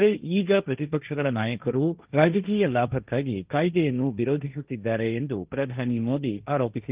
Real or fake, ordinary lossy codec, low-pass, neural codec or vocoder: fake; Opus, 32 kbps; 3.6 kHz; codec, 16 kHz, 1.1 kbps, Voila-Tokenizer